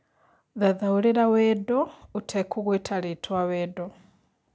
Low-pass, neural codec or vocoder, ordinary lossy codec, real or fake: none; none; none; real